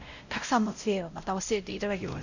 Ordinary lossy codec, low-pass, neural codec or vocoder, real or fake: none; 7.2 kHz; codec, 16 kHz, 0.5 kbps, X-Codec, WavLM features, trained on Multilingual LibriSpeech; fake